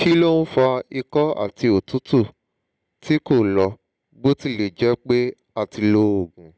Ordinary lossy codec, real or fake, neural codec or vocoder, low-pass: none; real; none; none